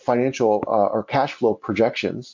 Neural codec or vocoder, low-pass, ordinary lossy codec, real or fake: none; 7.2 kHz; MP3, 48 kbps; real